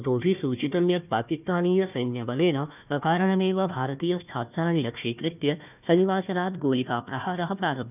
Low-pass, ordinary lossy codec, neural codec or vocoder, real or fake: 3.6 kHz; none; codec, 16 kHz, 1 kbps, FunCodec, trained on Chinese and English, 50 frames a second; fake